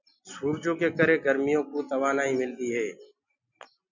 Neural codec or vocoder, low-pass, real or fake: none; 7.2 kHz; real